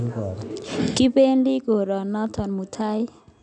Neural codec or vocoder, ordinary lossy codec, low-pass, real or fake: none; none; 9.9 kHz; real